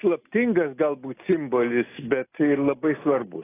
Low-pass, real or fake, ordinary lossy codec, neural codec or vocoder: 3.6 kHz; real; AAC, 16 kbps; none